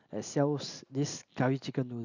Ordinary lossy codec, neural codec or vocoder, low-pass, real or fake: none; none; 7.2 kHz; real